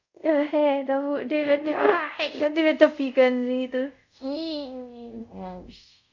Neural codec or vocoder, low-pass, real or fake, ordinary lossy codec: codec, 24 kHz, 0.5 kbps, DualCodec; 7.2 kHz; fake; MP3, 64 kbps